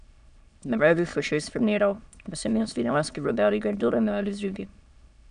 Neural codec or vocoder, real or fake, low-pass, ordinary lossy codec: autoencoder, 22.05 kHz, a latent of 192 numbers a frame, VITS, trained on many speakers; fake; 9.9 kHz; none